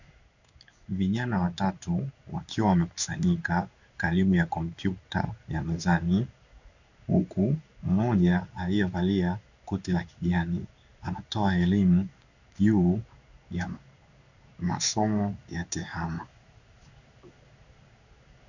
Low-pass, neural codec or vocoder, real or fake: 7.2 kHz; codec, 16 kHz in and 24 kHz out, 1 kbps, XY-Tokenizer; fake